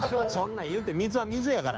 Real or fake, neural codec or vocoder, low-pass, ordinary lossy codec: fake; codec, 16 kHz, 2 kbps, FunCodec, trained on Chinese and English, 25 frames a second; none; none